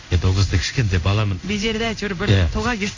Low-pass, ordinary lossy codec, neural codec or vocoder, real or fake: 7.2 kHz; AAC, 48 kbps; codec, 16 kHz in and 24 kHz out, 1 kbps, XY-Tokenizer; fake